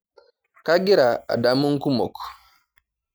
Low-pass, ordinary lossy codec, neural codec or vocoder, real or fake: none; none; none; real